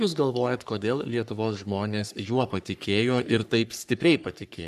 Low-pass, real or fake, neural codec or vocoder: 14.4 kHz; fake; codec, 44.1 kHz, 3.4 kbps, Pupu-Codec